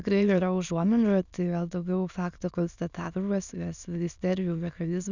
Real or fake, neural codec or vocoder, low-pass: fake; autoencoder, 22.05 kHz, a latent of 192 numbers a frame, VITS, trained on many speakers; 7.2 kHz